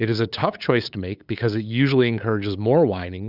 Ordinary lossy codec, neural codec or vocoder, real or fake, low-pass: Opus, 64 kbps; codec, 16 kHz, 8 kbps, FunCodec, trained on LibriTTS, 25 frames a second; fake; 5.4 kHz